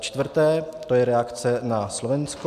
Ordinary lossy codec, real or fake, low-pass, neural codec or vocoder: MP3, 96 kbps; real; 14.4 kHz; none